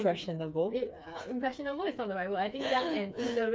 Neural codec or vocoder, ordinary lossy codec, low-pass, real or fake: codec, 16 kHz, 4 kbps, FreqCodec, smaller model; none; none; fake